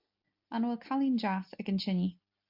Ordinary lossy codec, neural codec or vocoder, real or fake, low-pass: MP3, 48 kbps; none; real; 5.4 kHz